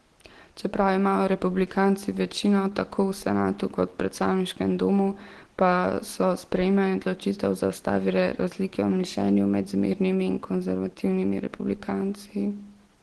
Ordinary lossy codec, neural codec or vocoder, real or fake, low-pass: Opus, 16 kbps; none; real; 10.8 kHz